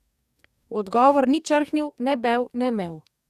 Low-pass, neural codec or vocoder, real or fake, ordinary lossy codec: 14.4 kHz; codec, 44.1 kHz, 2.6 kbps, DAC; fake; none